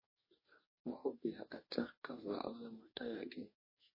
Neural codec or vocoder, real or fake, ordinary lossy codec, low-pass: codec, 44.1 kHz, 2.6 kbps, DAC; fake; MP3, 24 kbps; 5.4 kHz